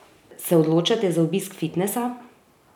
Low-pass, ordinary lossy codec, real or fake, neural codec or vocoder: 19.8 kHz; none; real; none